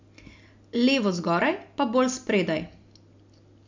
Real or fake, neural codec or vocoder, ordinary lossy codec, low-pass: real; none; MP3, 64 kbps; 7.2 kHz